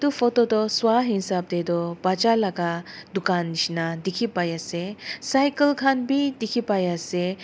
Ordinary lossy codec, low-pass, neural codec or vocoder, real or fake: none; none; none; real